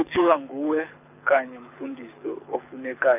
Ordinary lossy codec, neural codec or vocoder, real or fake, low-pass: none; codec, 24 kHz, 6 kbps, HILCodec; fake; 3.6 kHz